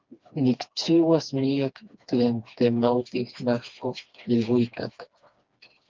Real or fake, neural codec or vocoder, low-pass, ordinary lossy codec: fake; codec, 16 kHz, 2 kbps, FreqCodec, smaller model; 7.2 kHz; Opus, 24 kbps